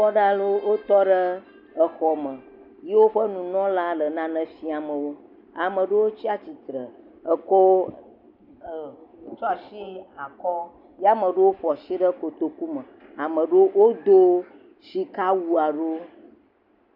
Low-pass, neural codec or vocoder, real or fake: 5.4 kHz; none; real